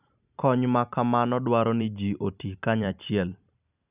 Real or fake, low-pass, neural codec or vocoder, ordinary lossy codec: fake; 3.6 kHz; vocoder, 44.1 kHz, 128 mel bands every 256 samples, BigVGAN v2; none